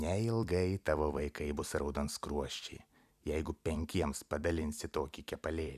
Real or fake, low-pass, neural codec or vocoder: fake; 14.4 kHz; vocoder, 44.1 kHz, 128 mel bands every 256 samples, BigVGAN v2